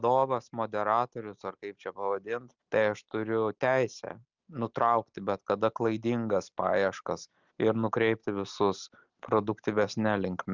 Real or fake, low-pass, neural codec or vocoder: real; 7.2 kHz; none